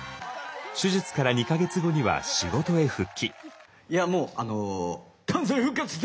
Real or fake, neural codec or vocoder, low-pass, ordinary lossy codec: real; none; none; none